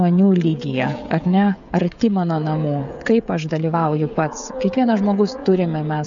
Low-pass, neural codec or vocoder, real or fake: 7.2 kHz; codec, 16 kHz, 16 kbps, FreqCodec, smaller model; fake